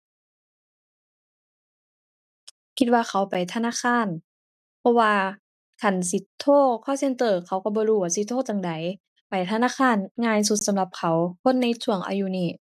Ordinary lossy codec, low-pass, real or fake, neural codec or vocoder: none; 14.4 kHz; real; none